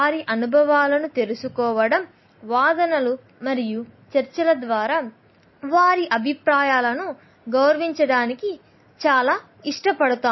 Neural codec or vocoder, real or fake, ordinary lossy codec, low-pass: none; real; MP3, 24 kbps; 7.2 kHz